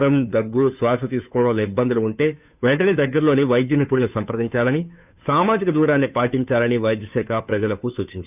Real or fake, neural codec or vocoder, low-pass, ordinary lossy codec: fake; codec, 16 kHz, 2 kbps, FunCodec, trained on Chinese and English, 25 frames a second; 3.6 kHz; none